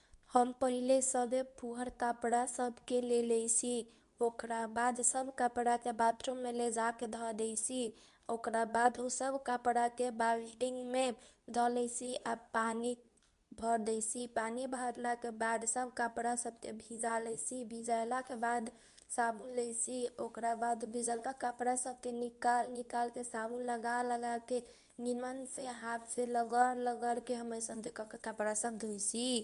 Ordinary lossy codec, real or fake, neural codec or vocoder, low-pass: none; fake; codec, 24 kHz, 0.9 kbps, WavTokenizer, medium speech release version 2; 10.8 kHz